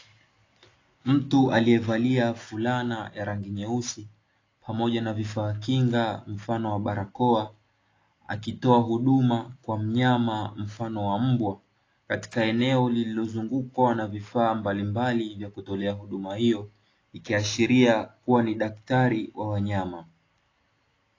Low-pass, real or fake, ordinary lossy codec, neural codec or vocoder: 7.2 kHz; real; AAC, 32 kbps; none